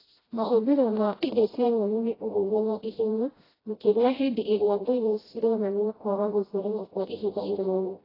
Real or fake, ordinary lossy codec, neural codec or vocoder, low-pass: fake; AAC, 24 kbps; codec, 16 kHz, 0.5 kbps, FreqCodec, smaller model; 5.4 kHz